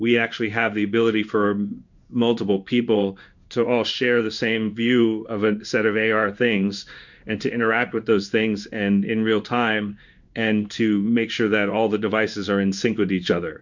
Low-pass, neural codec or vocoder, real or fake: 7.2 kHz; codec, 16 kHz in and 24 kHz out, 1 kbps, XY-Tokenizer; fake